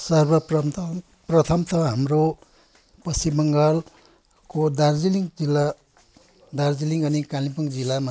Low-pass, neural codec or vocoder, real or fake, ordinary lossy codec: none; none; real; none